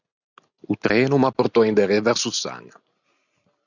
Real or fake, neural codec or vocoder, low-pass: real; none; 7.2 kHz